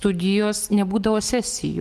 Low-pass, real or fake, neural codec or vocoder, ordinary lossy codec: 14.4 kHz; real; none; Opus, 24 kbps